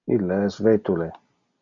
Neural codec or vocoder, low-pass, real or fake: none; 7.2 kHz; real